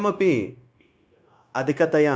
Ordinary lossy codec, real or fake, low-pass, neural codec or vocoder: none; fake; none; codec, 16 kHz, 0.9 kbps, LongCat-Audio-Codec